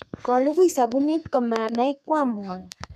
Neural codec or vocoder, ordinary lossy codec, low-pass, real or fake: codec, 32 kHz, 1.9 kbps, SNAC; none; 14.4 kHz; fake